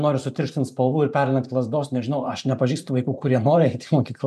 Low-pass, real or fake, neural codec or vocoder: 14.4 kHz; fake; vocoder, 44.1 kHz, 128 mel bands every 512 samples, BigVGAN v2